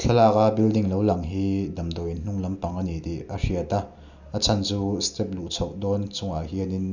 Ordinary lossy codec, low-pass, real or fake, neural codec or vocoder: none; 7.2 kHz; real; none